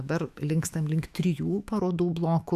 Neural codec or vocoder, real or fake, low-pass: autoencoder, 48 kHz, 128 numbers a frame, DAC-VAE, trained on Japanese speech; fake; 14.4 kHz